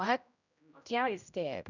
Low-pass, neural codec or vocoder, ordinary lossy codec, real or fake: 7.2 kHz; codec, 16 kHz, 0.5 kbps, X-Codec, HuBERT features, trained on general audio; none; fake